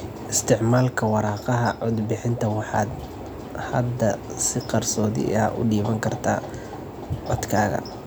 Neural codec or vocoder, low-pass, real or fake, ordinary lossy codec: none; none; real; none